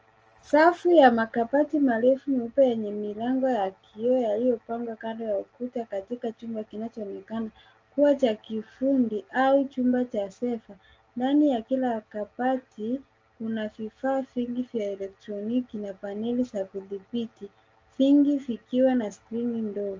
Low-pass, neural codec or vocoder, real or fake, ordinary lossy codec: 7.2 kHz; none; real; Opus, 24 kbps